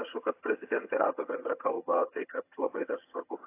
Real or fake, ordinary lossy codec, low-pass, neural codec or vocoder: fake; AAC, 24 kbps; 3.6 kHz; vocoder, 22.05 kHz, 80 mel bands, HiFi-GAN